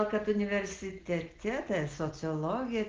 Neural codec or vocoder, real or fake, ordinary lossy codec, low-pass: none; real; Opus, 16 kbps; 7.2 kHz